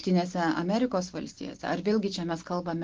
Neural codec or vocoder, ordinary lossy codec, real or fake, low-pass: none; Opus, 16 kbps; real; 7.2 kHz